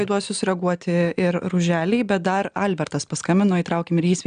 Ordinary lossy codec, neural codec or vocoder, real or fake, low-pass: MP3, 96 kbps; none; real; 9.9 kHz